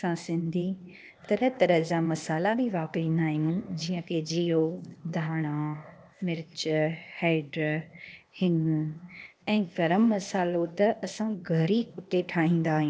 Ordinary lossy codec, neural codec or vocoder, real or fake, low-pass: none; codec, 16 kHz, 0.8 kbps, ZipCodec; fake; none